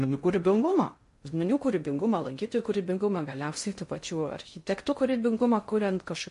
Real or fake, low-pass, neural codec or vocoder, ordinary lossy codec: fake; 10.8 kHz; codec, 16 kHz in and 24 kHz out, 0.6 kbps, FocalCodec, streaming, 2048 codes; MP3, 48 kbps